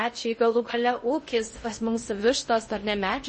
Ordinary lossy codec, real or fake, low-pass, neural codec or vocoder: MP3, 32 kbps; fake; 10.8 kHz; codec, 16 kHz in and 24 kHz out, 0.6 kbps, FocalCodec, streaming, 2048 codes